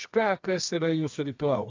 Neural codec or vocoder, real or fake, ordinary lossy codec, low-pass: codec, 24 kHz, 0.9 kbps, WavTokenizer, medium music audio release; fake; AAC, 48 kbps; 7.2 kHz